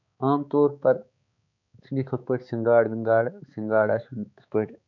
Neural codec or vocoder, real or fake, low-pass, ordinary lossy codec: codec, 16 kHz, 4 kbps, X-Codec, HuBERT features, trained on balanced general audio; fake; 7.2 kHz; none